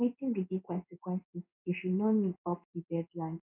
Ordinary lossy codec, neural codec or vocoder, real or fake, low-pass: none; codec, 16 kHz in and 24 kHz out, 1 kbps, XY-Tokenizer; fake; 3.6 kHz